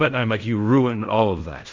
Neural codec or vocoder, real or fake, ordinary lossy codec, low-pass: codec, 16 kHz in and 24 kHz out, 0.4 kbps, LongCat-Audio-Codec, fine tuned four codebook decoder; fake; MP3, 64 kbps; 7.2 kHz